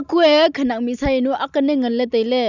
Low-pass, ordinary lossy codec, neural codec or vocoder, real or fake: 7.2 kHz; none; none; real